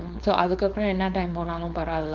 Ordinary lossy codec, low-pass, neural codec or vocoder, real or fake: none; 7.2 kHz; codec, 16 kHz, 4.8 kbps, FACodec; fake